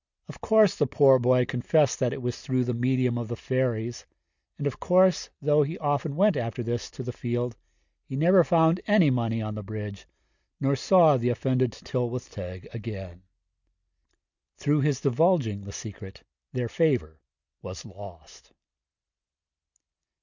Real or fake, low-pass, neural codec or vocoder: real; 7.2 kHz; none